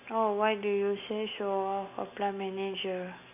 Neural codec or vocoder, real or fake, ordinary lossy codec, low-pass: none; real; none; 3.6 kHz